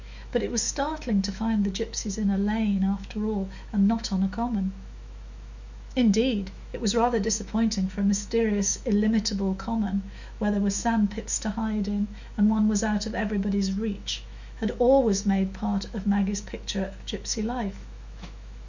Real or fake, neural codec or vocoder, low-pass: fake; autoencoder, 48 kHz, 128 numbers a frame, DAC-VAE, trained on Japanese speech; 7.2 kHz